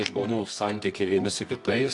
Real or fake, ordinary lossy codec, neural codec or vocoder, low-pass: fake; AAC, 64 kbps; codec, 24 kHz, 0.9 kbps, WavTokenizer, medium music audio release; 10.8 kHz